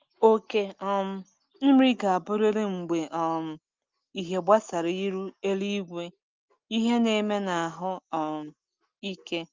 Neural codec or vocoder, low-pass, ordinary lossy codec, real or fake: none; 7.2 kHz; Opus, 32 kbps; real